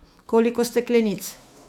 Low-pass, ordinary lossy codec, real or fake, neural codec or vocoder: 19.8 kHz; none; fake; autoencoder, 48 kHz, 128 numbers a frame, DAC-VAE, trained on Japanese speech